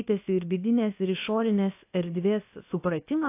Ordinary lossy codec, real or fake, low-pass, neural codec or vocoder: AAC, 24 kbps; fake; 3.6 kHz; codec, 16 kHz, about 1 kbps, DyCAST, with the encoder's durations